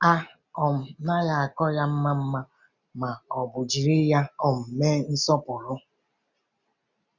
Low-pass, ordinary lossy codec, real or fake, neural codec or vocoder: 7.2 kHz; none; real; none